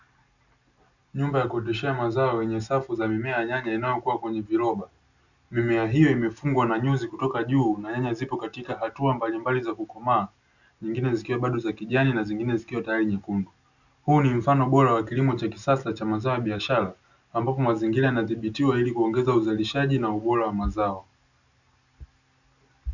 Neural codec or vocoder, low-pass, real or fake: none; 7.2 kHz; real